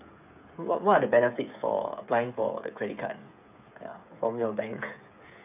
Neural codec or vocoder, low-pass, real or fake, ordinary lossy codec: codec, 16 kHz, 8 kbps, FreqCodec, smaller model; 3.6 kHz; fake; none